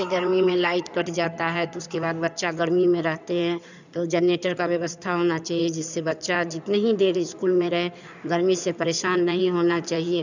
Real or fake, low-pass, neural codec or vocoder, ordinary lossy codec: fake; 7.2 kHz; codec, 16 kHz in and 24 kHz out, 2.2 kbps, FireRedTTS-2 codec; none